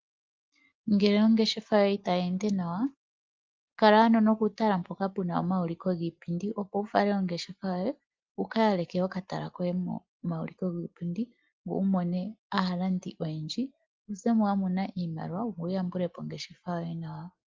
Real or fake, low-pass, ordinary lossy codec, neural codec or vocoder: real; 7.2 kHz; Opus, 24 kbps; none